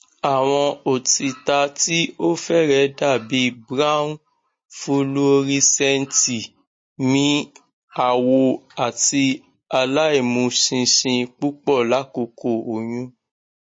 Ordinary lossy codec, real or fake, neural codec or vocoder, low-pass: MP3, 32 kbps; real; none; 9.9 kHz